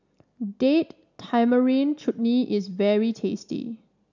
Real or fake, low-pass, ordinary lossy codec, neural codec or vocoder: real; 7.2 kHz; none; none